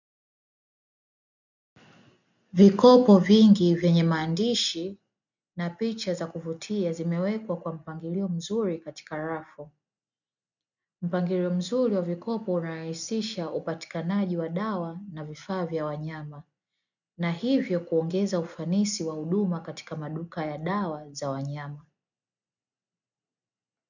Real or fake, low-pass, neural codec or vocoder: real; 7.2 kHz; none